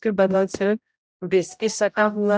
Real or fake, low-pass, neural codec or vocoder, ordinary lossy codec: fake; none; codec, 16 kHz, 0.5 kbps, X-Codec, HuBERT features, trained on general audio; none